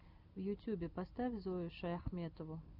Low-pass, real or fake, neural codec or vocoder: 5.4 kHz; real; none